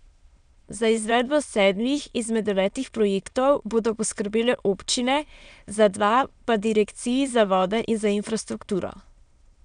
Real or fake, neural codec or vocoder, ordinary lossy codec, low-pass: fake; autoencoder, 22.05 kHz, a latent of 192 numbers a frame, VITS, trained on many speakers; none; 9.9 kHz